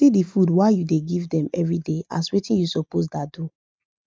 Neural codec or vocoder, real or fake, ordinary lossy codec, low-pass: none; real; none; none